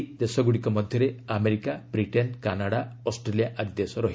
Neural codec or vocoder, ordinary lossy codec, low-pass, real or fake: none; none; none; real